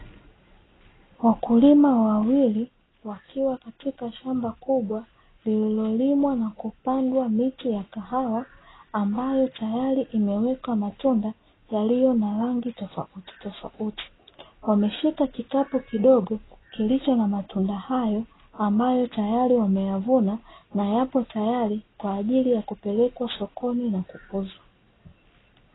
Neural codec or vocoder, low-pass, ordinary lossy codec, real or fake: none; 7.2 kHz; AAC, 16 kbps; real